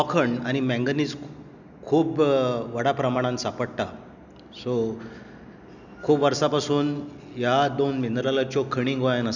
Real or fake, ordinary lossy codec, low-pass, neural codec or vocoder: real; none; 7.2 kHz; none